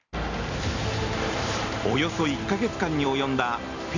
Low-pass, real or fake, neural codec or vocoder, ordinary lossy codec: 7.2 kHz; real; none; none